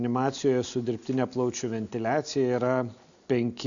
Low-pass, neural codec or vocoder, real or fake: 7.2 kHz; none; real